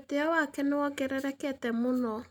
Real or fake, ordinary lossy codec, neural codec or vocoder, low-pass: real; none; none; none